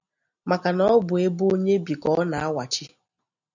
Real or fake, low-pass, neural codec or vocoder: real; 7.2 kHz; none